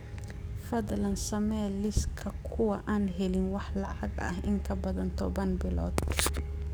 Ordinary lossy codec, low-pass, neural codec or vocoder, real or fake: none; none; codec, 44.1 kHz, 7.8 kbps, DAC; fake